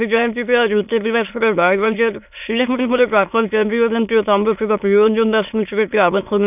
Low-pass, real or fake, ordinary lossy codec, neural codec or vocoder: 3.6 kHz; fake; none; autoencoder, 22.05 kHz, a latent of 192 numbers a frame, VITS, trained on many speakers